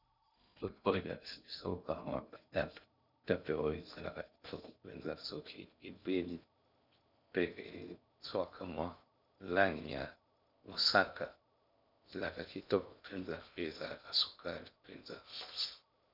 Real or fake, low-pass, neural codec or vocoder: fake; 5.4 kHz; codec, 16 kHz in and 24 kHz out, 0.6 kbps, FocalCodec, streaming, 2048 codes